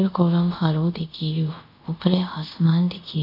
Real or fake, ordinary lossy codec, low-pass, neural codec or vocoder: fake; none; 5.4 kHz; codec, 24 kHz, 0.5 kbps, DualCodec